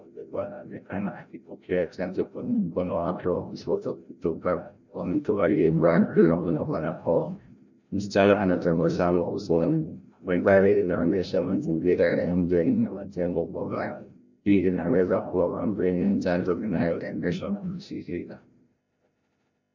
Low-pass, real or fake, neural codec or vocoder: 7.2 kHz; fake; codec, 16 kHz, 0.5 kbps, FreqCodec, larger model